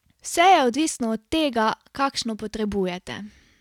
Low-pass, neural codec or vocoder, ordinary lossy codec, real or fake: 19.8 kHz; none; none; real